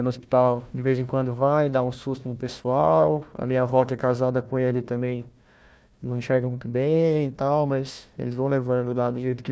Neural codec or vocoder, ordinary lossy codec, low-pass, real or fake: codec, 16 kHz, 1 kbps, FunCodec, trained on Chinese and English, 50 frames a second; none; none; fake